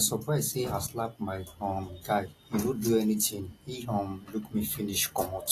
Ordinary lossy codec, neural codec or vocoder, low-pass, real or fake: AAC, 48 kbps; none; 14.4 kHz; real